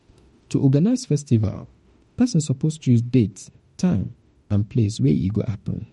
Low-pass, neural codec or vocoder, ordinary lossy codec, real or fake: 19.8 kHz; autoencoder, 48 kHz, 32 numbers a frame, DAC-VAE, trained on Japanese speech; MP3, 48 kbps; fake